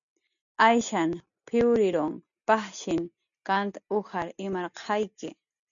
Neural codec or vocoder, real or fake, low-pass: none; real; 7.2 kHz